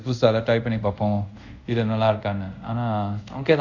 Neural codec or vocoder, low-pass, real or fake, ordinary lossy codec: codec, 24 kHz, 0.5 kbps, DualCodec; 7.2 kHz; fake; none